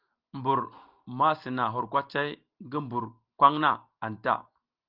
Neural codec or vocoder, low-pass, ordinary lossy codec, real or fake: none; 5.4 kHz; Opus, 32 kbps; real